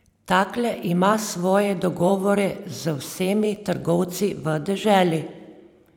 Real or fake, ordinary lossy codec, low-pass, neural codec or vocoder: fake; none; 19.8 kHz; vocoder, 44.1 kHz, 128 mel bands every 512 samples, BigVGAN v2